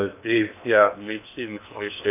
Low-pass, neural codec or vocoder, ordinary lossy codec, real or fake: 3.6 kHz; codec, 16 kHz in and 24 kHz out, 0.8 kbps, FocalCodec, streaming, 65536 codes; none; fake